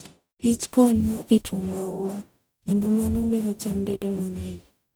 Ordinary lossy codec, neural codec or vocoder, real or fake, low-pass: none; codec, 44.1 kHz, 0.9 kbps, DAC; fake; none